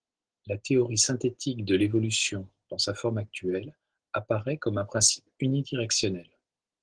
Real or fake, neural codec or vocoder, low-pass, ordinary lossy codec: real; none; 9.9 kHz; Opus, 16 kbps